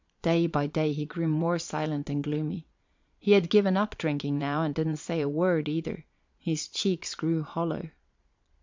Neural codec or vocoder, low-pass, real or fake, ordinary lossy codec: vocoder, 22.05 kHz, 80 mel bands, Vocos; 7.2 kHz; fake; MP3, 64 kbps